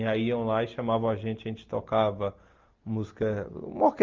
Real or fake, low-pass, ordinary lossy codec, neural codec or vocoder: real; 7.2 kHz; Opus, 32 kbps; none